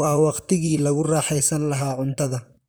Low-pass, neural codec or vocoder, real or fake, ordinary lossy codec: none; vocoder, 44.1 kHz, 128 mel bands, Pupu-Vocoder; fake; none